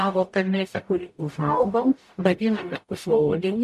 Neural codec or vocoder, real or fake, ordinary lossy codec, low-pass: codec, 44.1 kHz, 0.9 kbps, DAC; fake; MP3, 64 kbps; 14.4 kHz